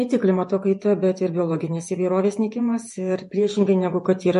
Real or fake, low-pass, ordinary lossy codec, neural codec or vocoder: fake; 14.4 kHz; MP3, 48 kbps; vocoder, 48 kHz, 128 mel bands, Vocos